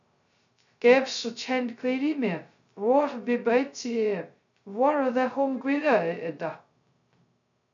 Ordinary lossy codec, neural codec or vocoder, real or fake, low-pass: MP3, 96 kbps; codec, 16 kHz, 0.2 kbps, FocalCodec; fake; 7.2 kHz